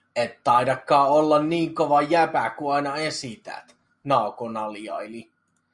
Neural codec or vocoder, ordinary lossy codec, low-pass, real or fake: none; MP3, 96 kbps; 10.8 kHz; real